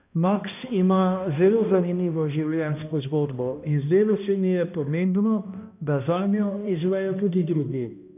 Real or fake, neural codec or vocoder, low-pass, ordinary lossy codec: fake; codec, 16 kHz, 1 kbps, X-Codec, HuBERT features, trained on balanced general audio; 3.6 kHz; none